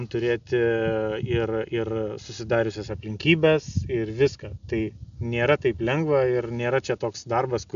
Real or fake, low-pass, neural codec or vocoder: real; 7.2 kHz; none